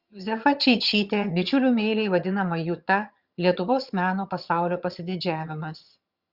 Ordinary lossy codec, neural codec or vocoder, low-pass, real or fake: Opus, 64 kbps; vocoder, 22.05 kHz, 80 mel bands, HiFi-GAN; 5.4 kHz; fake